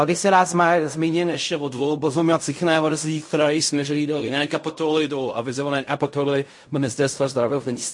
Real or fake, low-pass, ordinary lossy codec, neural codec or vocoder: fake; 10.8 kHz; MP3, 48 kbps; codec, 16 kHz in and 24 kHz out, 0.4 kbps, LongCat-Audio-Codec, fine tuned four codebook decoder